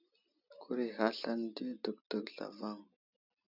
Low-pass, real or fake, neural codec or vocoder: 5.4 kHz; real; none